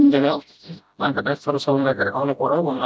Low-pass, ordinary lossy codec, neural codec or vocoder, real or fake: none; none; codec, 16 kHz, 0.5 kbps, FreqCodec, smaller model; fake